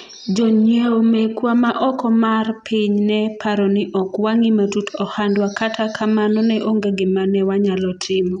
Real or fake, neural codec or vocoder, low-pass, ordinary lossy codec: real; none; 10.8 kHz; none